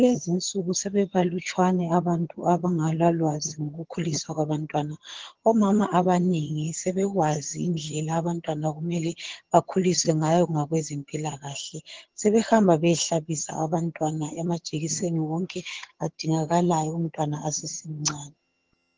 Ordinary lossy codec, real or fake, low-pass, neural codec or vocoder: Opus, 16 kbps; fake; 7.2 kHz; vocoder, 22.05 kHz, 80 mel bands, HiFi-GAN